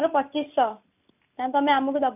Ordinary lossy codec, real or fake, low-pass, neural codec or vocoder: none; real; 3.6 kHz; none